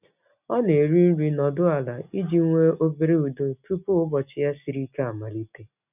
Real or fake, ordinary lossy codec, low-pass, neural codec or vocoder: real; none; 3.6 kHz; none